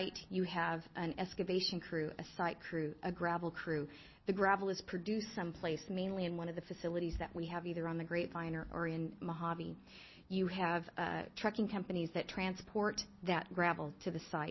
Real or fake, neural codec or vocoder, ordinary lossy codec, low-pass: real; none; MP3, 24 kbps; 7.2 kHz